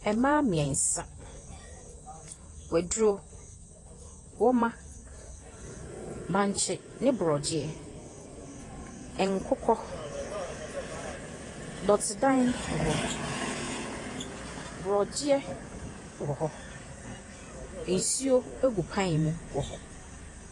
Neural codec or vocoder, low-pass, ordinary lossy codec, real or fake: vocoder, 48 kHz, 128 mel bands, Vocos; 10.8 kHz; AAC, 32 kbps; fake